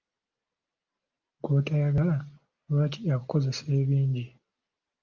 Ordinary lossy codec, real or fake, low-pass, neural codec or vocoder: Opus, 24 kbps; real; 7.2 kHz; none